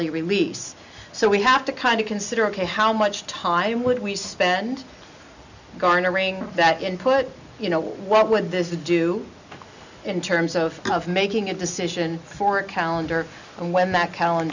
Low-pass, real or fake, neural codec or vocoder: 7.2 kHz; real; none